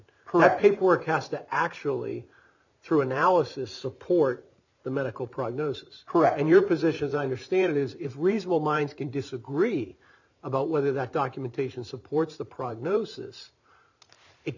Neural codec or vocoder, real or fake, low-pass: vocoder, 44.1 kHz, 128 mel bands every 512 samples, BigVGAN v2; fake; 7.2 kHz